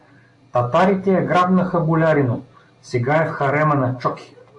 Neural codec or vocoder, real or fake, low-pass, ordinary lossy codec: none; real; 10.8 kHz; AAC, 64 kbps